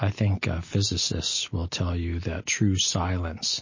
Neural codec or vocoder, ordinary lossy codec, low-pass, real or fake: none; MP3, 32 kbps; 7.2 kHz; real